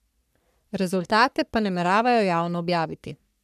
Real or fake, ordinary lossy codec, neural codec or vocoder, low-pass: fake; none; codec, 44.1 kHz, 3.4 kbps, Pupu-Codec; 14.4 kHz